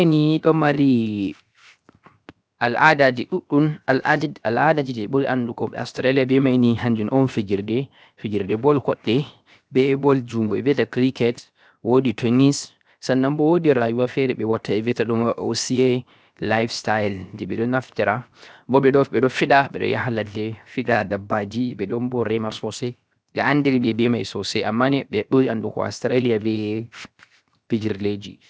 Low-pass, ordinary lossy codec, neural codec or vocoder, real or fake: none; none; codec, 16 kHz, 0.7 kbps, FocalCodec; fake